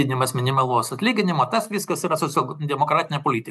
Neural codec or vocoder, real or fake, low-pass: none; real; 14.4 kHz